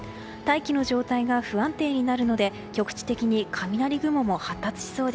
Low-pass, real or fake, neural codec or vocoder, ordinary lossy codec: none; real; none; none